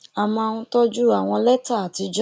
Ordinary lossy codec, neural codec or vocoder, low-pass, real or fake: none; none; none; real